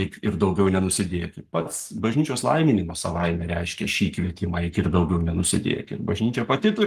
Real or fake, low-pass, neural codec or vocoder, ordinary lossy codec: fake; 14.4 kHz; codec, 44.1 kHz, 7.8 kbps, Pupu-Codec; Opus, 24 kbps